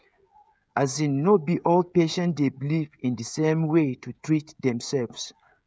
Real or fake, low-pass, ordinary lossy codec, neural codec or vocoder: fake; none; none; codec, 16 kHz, 16 kbps, FreqCodec, smaller model